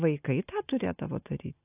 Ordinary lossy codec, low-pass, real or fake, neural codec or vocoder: AAC, 24 kbps; 3.6 kHz; real; none